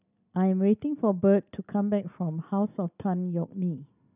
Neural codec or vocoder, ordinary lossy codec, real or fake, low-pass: none; none; real; 3.6 kHz